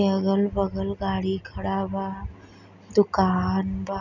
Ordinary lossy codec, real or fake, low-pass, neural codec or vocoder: none; real; 7.2 kHz; none